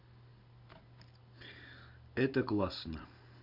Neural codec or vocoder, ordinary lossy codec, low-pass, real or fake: none; none; 5.4 kHz; real